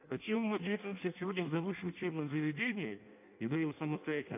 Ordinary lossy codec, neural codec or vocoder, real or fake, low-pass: AAC, 32 kbps; codec, 16 kHz in and 24 kHz out, 0.6 kbps, FireRedTTS-2 codec; fake; 3.6 kHz